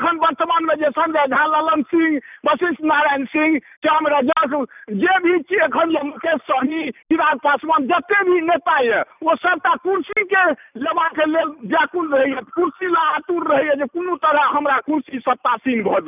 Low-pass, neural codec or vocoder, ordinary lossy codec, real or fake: 3.6 kHz; none; none; real